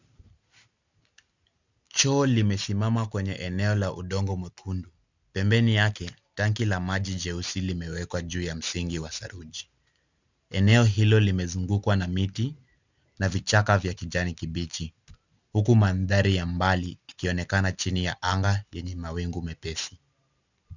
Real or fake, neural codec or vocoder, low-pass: real; none; 7.2 kHz